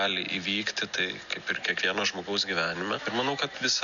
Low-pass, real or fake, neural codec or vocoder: 7.2 kHz; real; none